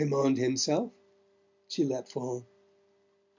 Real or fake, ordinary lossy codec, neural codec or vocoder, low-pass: real; MP3, 64 kbps; none; 7.2 kHz